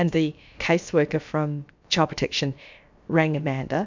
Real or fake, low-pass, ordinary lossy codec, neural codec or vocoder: fake; 7.2 kHz; MP3, 64 kbps; codec, 16 kHz, about 1 kbps, DyCAST, with the encoder's durations